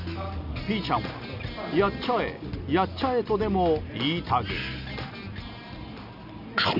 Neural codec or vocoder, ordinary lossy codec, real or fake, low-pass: none; none; real; 5.4 kHz